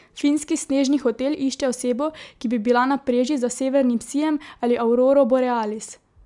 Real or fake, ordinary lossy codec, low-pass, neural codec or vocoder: real; MP3, 96 kbps; 10.8 kHz; none